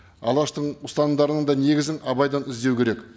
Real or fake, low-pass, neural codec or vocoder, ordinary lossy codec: real; none; none; none